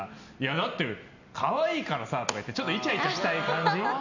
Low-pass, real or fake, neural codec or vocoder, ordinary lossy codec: 7.2 kHz; real; none; none